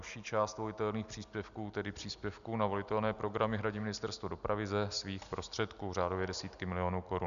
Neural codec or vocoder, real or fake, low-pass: none; real; 7.2 kHz